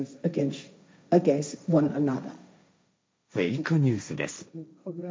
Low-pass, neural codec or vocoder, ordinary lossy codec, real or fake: none; codec, 16 kHz, 1.1 kbps, Voila-Tokenizer; none; fake